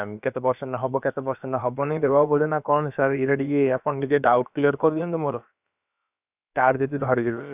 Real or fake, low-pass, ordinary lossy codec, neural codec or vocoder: fake; 3.6 kHz; none; codec, 16 kHz, about 1 kbps, DyCAST, with the encoder's durations